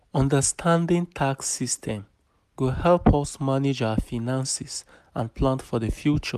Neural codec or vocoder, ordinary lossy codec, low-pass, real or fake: none; AAC, 96 kbps; 14.4 kHz; real